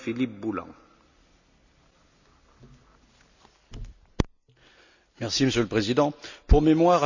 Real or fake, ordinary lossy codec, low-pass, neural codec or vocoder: real; none; 7.2 kHz; none